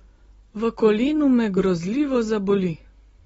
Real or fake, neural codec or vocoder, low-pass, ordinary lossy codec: real; none; 10.8 kHz; AAC, 24 kbps